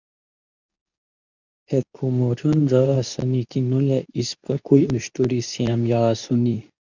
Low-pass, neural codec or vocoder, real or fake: 7.2 kHz; codec, 24 kHz, 0.9 kbps, WavTokenizer, medium speech release version 2; fake